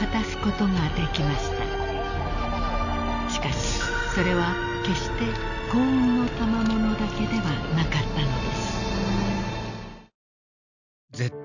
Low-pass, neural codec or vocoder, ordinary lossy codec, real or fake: 7.2 kHz; none; none; real